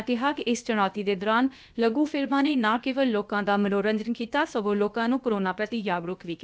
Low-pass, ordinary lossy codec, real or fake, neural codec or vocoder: none; none; fake; codec, 16 kHz, about 1 kbps, DyCAST, with the encoder's durations